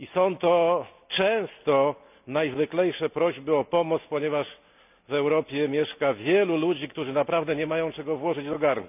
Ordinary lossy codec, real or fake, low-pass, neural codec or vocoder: none; real; 3.6 kHz; none